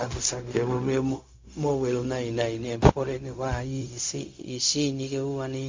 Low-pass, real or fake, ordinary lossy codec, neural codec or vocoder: 7.2 kHz; fake; MP3, 48 kbps; codec, 16 kHz, 0.4 kbps, LongCat-Audio-Codec